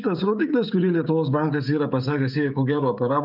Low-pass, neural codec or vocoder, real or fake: 5.4 kHz; codec, 16 kHz, 16 kbps, FreqCodec, smaller model; fake